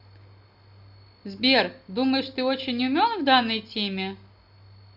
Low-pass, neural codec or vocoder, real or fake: 5.4 kHz; none; real